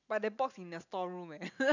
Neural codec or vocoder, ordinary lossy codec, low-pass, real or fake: none; none; 7.2 kHz; real